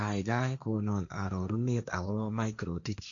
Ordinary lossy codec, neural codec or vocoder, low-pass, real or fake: none; codec, 16 kHz, 1.1 kbps, Voila-Tokenizer; 7.2 kHz; fake